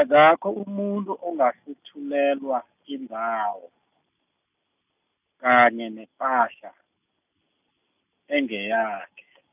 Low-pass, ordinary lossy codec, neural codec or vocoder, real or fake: 3.6 kHz; none; none; real